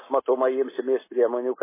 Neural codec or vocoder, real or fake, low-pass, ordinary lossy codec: none; real; 3.6 kHz; MP3, 16 kbps